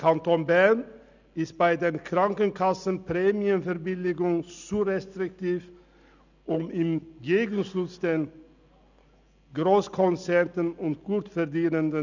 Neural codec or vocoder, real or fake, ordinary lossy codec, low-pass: none; real; none; 7.2 kHz